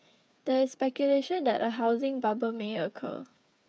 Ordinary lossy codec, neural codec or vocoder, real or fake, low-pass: none; codec, 16 kHz, 8 kbps, FreqCodec, smaller model; fake; none